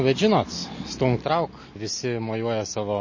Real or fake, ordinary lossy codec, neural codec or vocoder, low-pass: real; MP3, 32 kbps; none; 7.2 kHz